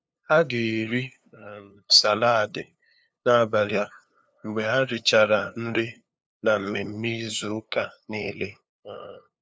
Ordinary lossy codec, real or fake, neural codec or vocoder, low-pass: none; fake; codec, 16 kHz, 2 kbps, FunCodec, trained on LibriTTS, 25 frames a second; none